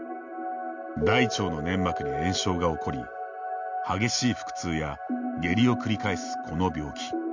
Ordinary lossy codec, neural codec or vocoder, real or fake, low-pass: none; none; real; 7.2 kHz